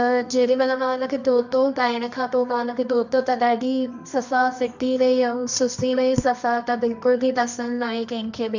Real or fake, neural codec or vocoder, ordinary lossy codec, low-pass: fake; codec, 24 kHz, 0.9 kbps, WavTokenizer, medium music audio release; none; 7.2 kHz